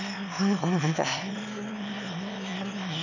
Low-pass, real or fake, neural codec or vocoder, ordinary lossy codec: 7.2 kHz; fake; autoencoder, 22.05 kHz, a latent of 192 numbers a frame, VITS, trained on one speaker; none